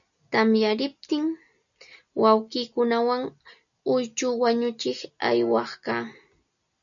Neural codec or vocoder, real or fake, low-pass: none; real; 7.2 kHz